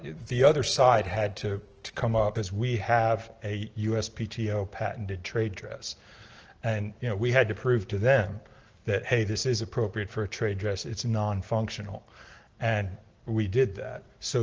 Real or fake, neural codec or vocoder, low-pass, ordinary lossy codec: real; none; 7.2 kHz; Opus, 16 kbps